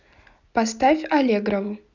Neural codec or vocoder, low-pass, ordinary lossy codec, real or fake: none; 7.2 kHz; none; real